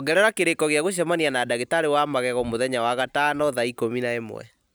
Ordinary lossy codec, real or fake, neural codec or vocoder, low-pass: none; real; none; none